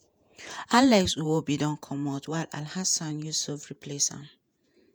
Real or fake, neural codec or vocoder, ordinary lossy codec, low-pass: fake; vocoder, 48 kHz, 128 mel bands, Vocos; none; none